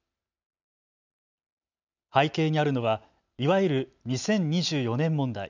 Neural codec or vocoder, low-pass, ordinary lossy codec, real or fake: none; 7.2 kHz; none; real